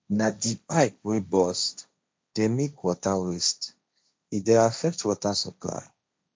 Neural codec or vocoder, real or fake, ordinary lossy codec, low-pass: codec, 16 kHz, 1.1 kbps, Voila-Tokenizer; fake; none; none